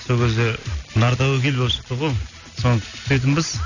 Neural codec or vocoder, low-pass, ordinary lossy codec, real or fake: none; 7.2 kHz; none; real